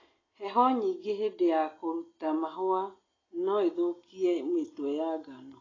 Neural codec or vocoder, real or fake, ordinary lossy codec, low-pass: none; real; AAC, 32 kbps; 7.2 kHz